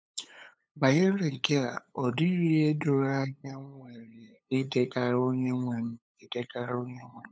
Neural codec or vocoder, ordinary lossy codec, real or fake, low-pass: codec, 16 kHz, 8 kbps, FunCodec, trained on LibriTTS, 25 frames a second; none; fake; none